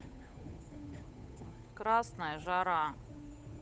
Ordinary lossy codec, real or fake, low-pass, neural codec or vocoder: none; fake; none; codec, 16 kHz, 8 kbps, FunCodec, trained on Chinese and English, 25 frames a second